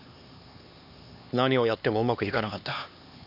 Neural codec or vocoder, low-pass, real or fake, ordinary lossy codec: codec, 16 kHz, 2 kbps, X-Codec, HuBERT features, trained on LibriSpeech; 5.4 kHz; fake; none